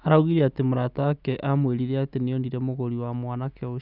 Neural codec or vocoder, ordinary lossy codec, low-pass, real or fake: none; none; 5.4 kHz; real